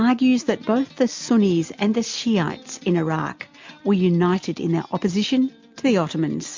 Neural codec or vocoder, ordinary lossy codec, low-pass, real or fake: none; MP3, 48 kbps; 7.2 kHz; real